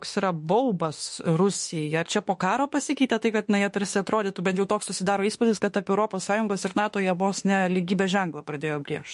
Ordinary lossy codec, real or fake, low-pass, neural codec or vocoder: MP3, 48 kbps; fake; 14.4 kHz; autoencoder, 48 kHz, 32 numbers a frame, DAC-VAE, trained on Japanese speech